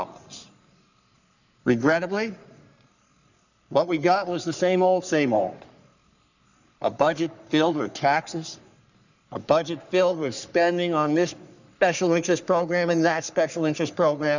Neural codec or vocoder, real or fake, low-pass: codec, 44.1 kHz, 3.4 kbps, Pupu-Codec; fake; 7.2 kHz